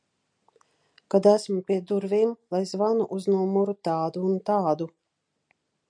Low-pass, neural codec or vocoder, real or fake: 9.9 kHz; none; real